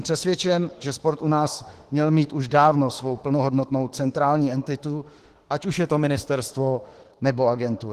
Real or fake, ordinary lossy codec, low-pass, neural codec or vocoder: fake; Opus, 16 kbps; 14.4 kHz; autoencoder, 48 kHz, 32 numbers a frame, DAC-VAE, trained on Japanese speech